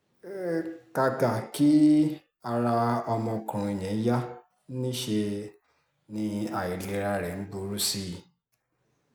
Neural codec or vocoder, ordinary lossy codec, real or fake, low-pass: none; none; real; none